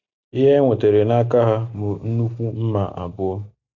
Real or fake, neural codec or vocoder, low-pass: real; none; 7.2 kHz